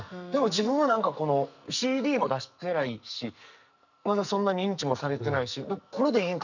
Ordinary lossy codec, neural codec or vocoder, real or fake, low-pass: none; codec, 44.1 kHz, 2.6 kbps, SNAC; fake; 7.2 kHz